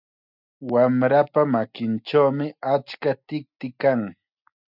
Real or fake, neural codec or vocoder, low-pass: real; none; 5.4 kHz